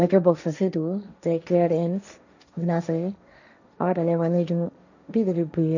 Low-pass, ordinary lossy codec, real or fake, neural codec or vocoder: 7.2 kHz; none; fake; codec, 16 kHz, 1.1 kbps, Voila-Tokenizer